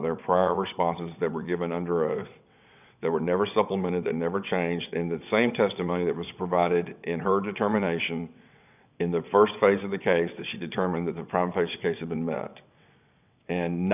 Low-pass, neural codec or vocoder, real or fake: 3.6 kHz; vocoder, 22.05 kHz, 80 mel bands, WaveNeXt; fake